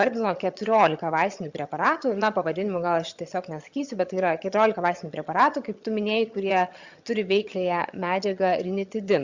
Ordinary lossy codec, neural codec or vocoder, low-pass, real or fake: Opus, 64 kbps; vocoder, 22.05 kHz, 80 mel bands, HiFi-GAN; 7.2 kHz; fake